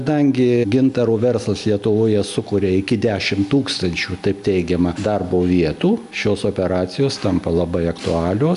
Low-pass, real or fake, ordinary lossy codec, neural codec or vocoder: 10.8 kHz; real; MP3, 96 kbps; none